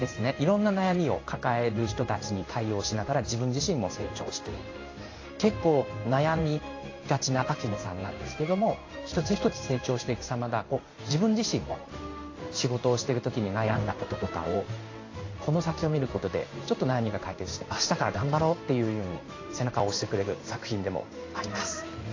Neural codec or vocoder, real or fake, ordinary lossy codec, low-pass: codec, 16 kHz in and 24 kHz out, 1 kbps, XY-Tokenizer; fake; AAC, 32 kbps; 7.2 kHz